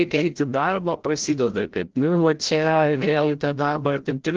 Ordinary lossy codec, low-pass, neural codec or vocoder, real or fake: Opus, 16 kbps; 7.2 kHz; codec, 16 kHz, 0.5 kbps, FreqCodec, larger model; fake